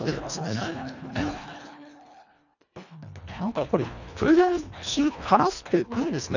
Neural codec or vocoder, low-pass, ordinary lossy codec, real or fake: codec, 24 kHz, 1.5 kbps, HILCodec; 7.2 kHz; none; fake